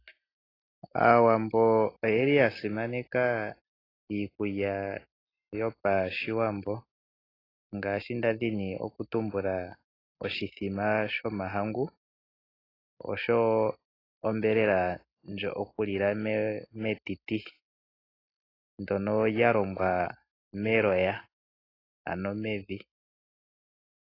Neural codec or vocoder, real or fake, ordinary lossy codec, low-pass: none; real; AAC, 24 kbps; 5.4 kHz